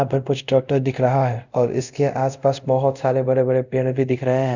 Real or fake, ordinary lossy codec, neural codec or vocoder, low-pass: fake; none; codec, 24 kHz, 0.5 kbps, DualCodec; 7.2 kHz